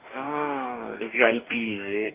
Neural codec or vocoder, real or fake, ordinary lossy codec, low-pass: codec, 44.1 kHz, 2.6 kbps, DAC; fake; Opus, 32 kbps; 3.6 kHz